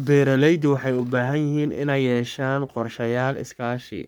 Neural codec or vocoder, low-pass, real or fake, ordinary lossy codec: codec, 44.1 kHz, 3.4 kbps, Pupu-Codec; none; fake; none